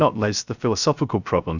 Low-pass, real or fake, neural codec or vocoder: 7.2 kHz; fake; codec, 16 kHz, 0.3 kbps, FocalCodec